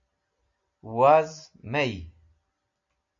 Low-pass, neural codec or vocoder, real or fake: 7.2 kHz; none; real